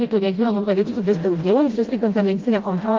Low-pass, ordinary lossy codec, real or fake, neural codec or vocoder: 7.2 kHz; Opus, 32 kbps; fake; codec, 16 kHz, 0.5 kbps, FreqCodec, smaller model